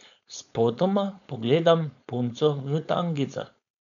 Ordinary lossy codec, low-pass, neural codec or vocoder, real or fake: none; 7.2 kHz; codec, 16 kHz, 4.8 kbps, FACodec; fake